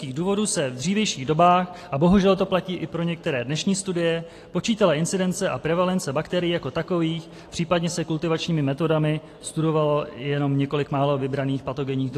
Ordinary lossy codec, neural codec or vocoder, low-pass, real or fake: AAC, 48 kbps; none; 14.4 kHz; real